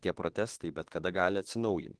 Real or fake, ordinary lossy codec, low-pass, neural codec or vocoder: fake; Opus, 16 kbps; 10.8 kHz; autoencoder, 48 kHz, 32 numbers a frame, DAC-VAE, trained on Japanese speech